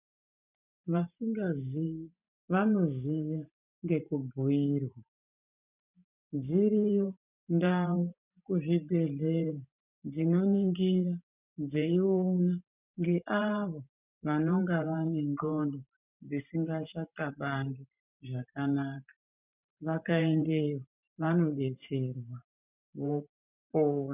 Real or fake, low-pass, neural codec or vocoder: fake; 3.6 kHz; vocoder, 44.1 kHz, 128 mel bands every 512 samples, BigVGAN v2